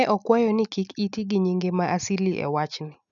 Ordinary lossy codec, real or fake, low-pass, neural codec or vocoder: none; real; 7.2 kHz; none